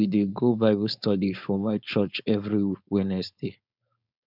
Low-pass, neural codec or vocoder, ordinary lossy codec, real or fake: 5.4 kHz; codec, 16 kHz, 4.8 kbps, FACodec; none; fake